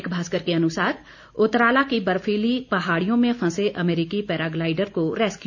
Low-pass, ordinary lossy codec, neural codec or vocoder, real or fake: 7.2 kHz; none; none; real